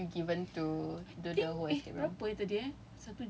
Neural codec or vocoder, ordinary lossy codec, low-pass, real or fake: none; none; none; real